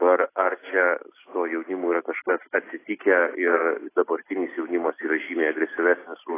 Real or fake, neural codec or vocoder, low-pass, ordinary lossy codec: real; none; 3.6 kHz; AAC, 16 kbps